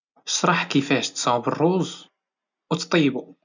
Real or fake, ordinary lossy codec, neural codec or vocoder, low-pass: real; none; none; 7.2 kHz